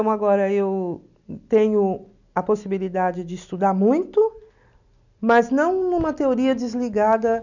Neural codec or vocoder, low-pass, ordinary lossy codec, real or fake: none; 7.2 kHz; none; real